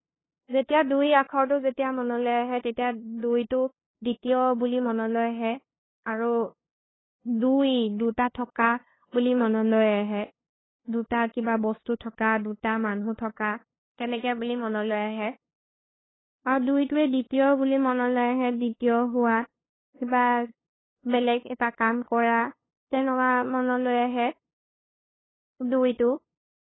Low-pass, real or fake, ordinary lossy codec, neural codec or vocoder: 7.2 kHz; fake; AAC, 16 kbps; codec, 16 kHz, 2 kbps, FunCodec, trained on LibriTTS, 25 frames a second